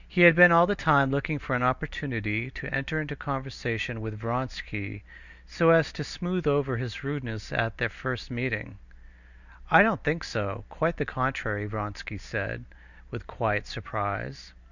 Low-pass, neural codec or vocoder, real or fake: 7.2 kHz; none; real